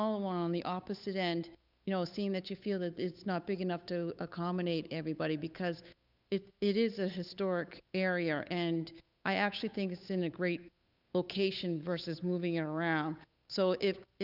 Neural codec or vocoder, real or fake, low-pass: codec, 16 kHz, 8 kbps, FunCodec, trained on Chinese and English, 25 frames a second; fake; 5.4 kHz